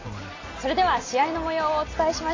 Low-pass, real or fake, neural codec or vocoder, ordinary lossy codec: 7.2 kHz; real; none; AAC, 32 kbps